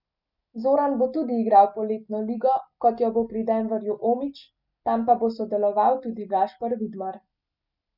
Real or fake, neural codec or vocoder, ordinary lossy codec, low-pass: real; none; none; 5.4 kHz